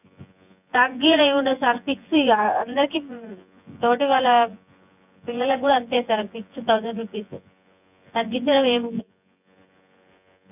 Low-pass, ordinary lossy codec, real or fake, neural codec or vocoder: 3.6 kHz; none; fake; vocoder, 24 kHz, 100 mel bands, Vocos